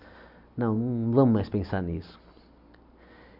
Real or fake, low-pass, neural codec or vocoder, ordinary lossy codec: real; 5.4 kHz; none; none